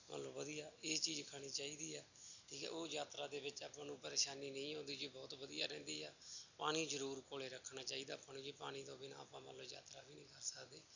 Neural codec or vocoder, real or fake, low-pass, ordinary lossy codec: none; real; 7.2 kHz; none